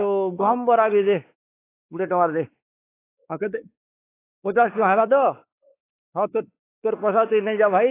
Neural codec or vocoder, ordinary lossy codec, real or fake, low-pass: codec, 16 kHz, 4 kbps, X-Codec, WavLM features, trained on Multilingual LibriSpeech; AAC, 24 kbps; fake; 3.6 kHz